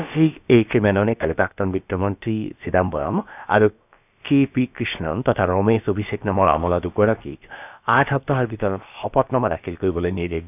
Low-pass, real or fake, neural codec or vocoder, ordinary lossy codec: 3.6 kHz; fake; codec, 16 kHz, about 1 kbps, DyCAST, with the encoder's durations; none